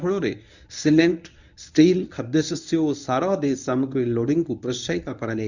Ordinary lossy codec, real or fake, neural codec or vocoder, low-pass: none; fake; codec, 24 kHz, 0.9 kbps, WavTokenizer, medium speech release version 1; 7.2 kHz